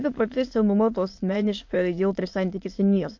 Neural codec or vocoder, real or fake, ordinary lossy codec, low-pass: autoencoder, 22.05 kHz, a latent of 192 numbers a frame, VITS, trained on many speakers; fake; MP3, 48 kbps; 7.2 kHz